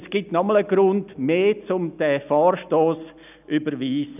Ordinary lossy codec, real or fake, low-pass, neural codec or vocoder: none; real; 3.6 kHz; none